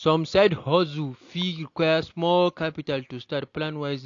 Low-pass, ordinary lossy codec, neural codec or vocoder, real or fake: 7.2 kHz; AAC, 48 kbps; none; real